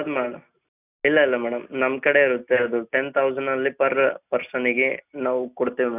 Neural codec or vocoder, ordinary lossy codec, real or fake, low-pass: none; none; real; 3.6 kHz